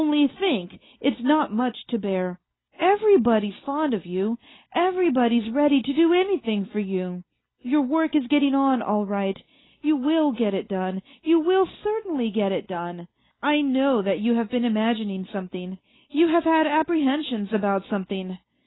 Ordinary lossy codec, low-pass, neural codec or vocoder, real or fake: AAC, 16 kbps; 7.2 kHz; none; real